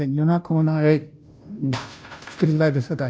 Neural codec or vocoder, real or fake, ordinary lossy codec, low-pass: codec, 16 kHz, 0.5 kbps, FunCodec, trained on Chinese and English, 25 frames a second; fake; none; none